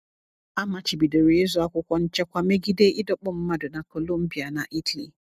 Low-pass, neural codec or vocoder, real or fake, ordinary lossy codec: 14.4 kHz; none; real; none